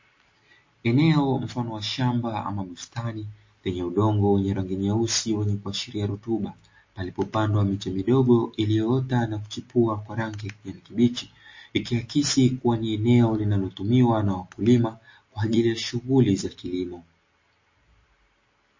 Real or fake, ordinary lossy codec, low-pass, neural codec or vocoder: real; MP3, 32 kbps; 7.2 kHz; none